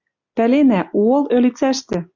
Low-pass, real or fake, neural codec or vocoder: 7.2 kHz; real; none